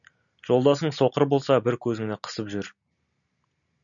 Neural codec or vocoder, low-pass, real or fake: none; 7.2 kHz; real